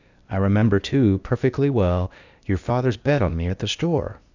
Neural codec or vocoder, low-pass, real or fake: codec, 16 kHz, 0.8 kbps, ZipCodec; 7.2 kHz; fake